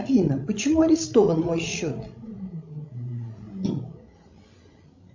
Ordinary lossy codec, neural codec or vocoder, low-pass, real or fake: MP3, 64 kbps; codec, 16 kHz, 16 kbps, FreqCodec, larger model; 7.2 kHz; fake